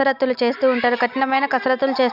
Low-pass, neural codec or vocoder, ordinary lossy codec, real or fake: 5.4 kHz; none; none; real